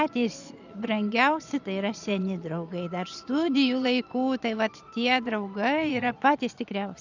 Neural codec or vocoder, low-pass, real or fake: vocoder, 22.05 kHz, 80 mel bands, Vocos; 7.2 kHz; fake